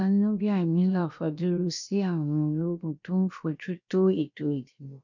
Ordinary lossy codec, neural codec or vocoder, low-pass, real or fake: none; codec, 16 kHz, 0.7 kbps, FocalCodec; 7.2 kHz; fake